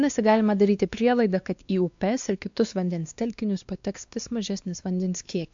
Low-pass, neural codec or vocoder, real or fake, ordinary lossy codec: 7.2 kHz; codec, 16 kHz, 2 kbps, X-Codec, WavLM features, trained on Multilingual LibriSpeech; fake; AAC, 64 kbps